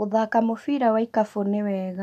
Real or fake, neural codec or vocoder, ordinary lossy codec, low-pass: real; none; none; 14.4 kHz